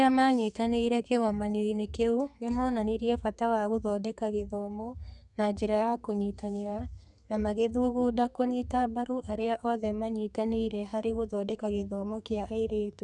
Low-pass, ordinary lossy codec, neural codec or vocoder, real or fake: 10.8 kHz; none; codec, 32 kHz, 1.9 kbps, SNAC; fake